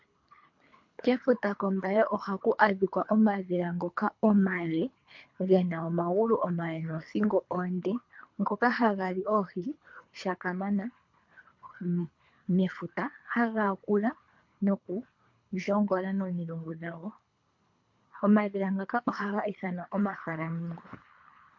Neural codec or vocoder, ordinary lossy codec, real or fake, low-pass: codec, 24 kHz, 3 kbps, HILCodec; MP3, 48 kbps; fake; 7.2 kHz